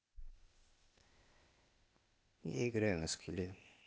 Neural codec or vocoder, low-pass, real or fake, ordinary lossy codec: codec, 16 kHz, 0.8 kbps, ZipCodec; none; fake; none